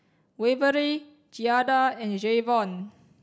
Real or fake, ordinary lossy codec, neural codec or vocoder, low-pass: real; none; none; none